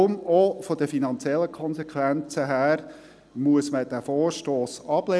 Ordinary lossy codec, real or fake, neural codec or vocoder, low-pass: none; real; none; none